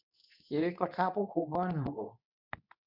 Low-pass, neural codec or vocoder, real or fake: 5.4 kHz; codec, 24 kHz, 0.9 kbps, WavTokenizer, medium speech release version 2; fake